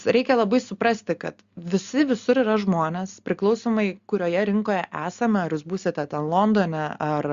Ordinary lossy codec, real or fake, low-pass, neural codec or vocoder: Opus, 64 kbps; real; 7.2 kHz; none